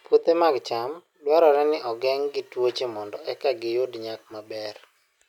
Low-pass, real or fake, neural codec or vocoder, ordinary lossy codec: 19.8 kHz; real; none; none